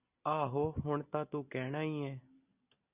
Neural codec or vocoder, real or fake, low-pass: none; real; 3.6 kHz